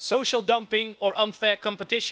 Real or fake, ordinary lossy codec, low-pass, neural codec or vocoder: fake; none; none; codec, 16 kHz, 0.8 kbps, ZipCodec